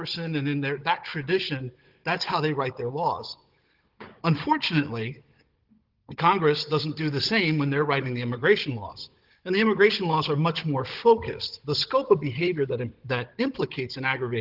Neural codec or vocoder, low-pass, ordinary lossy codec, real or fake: codec, 16 kHz, 16 kbps, FunCodec, trained on Chinese and English, 50 frames a second; 5.4 kHz; Opus, 16 kbps; fake